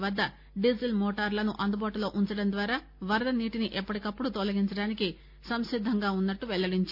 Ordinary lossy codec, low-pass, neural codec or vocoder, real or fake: none; 5.4 kHz; none; real